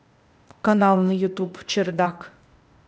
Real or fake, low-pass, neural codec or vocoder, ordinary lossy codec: fake; none; codec, 16 kHz, 0.8 kbps, ZipCodec; none